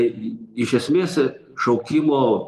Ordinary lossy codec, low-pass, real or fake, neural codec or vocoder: Opus, 32 kbps; 14.4 kHz; real; none